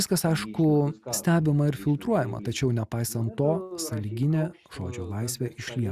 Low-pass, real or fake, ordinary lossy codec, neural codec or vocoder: 14.4 kHz; real; Opus, 64 kbps; none